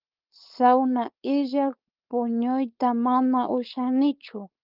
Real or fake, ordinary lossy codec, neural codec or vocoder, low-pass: fake; Opus, 24 kbps; codec, 16 kHz, 4.8 kbps, FACodec; 5.4 kHz